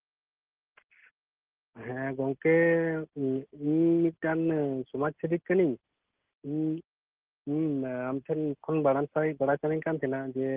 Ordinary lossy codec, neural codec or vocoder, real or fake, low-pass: Opus, 16 kbps; none; real; 3.6 kHz